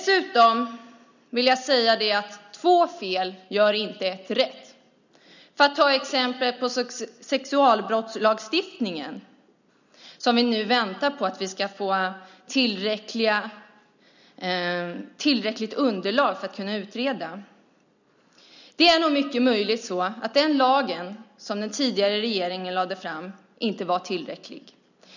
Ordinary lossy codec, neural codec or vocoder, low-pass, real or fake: none; none; 7.2 kHz; real